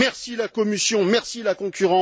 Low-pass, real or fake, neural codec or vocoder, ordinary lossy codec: none; real; none; none